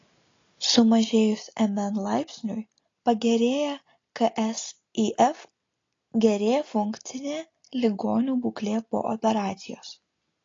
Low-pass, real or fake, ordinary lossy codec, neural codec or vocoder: 7.2 kHz; real; AAC, 32 kbps; none